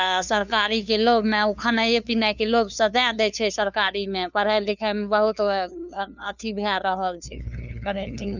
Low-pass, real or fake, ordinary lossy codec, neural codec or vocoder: 7.2 kHz; fake; none; codec, 16 kHz, 2 kbps, FunCodec, trained on LibriTTS, 25 frames a second